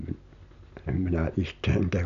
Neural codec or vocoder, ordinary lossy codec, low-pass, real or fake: none; none; 7.2 kHz; real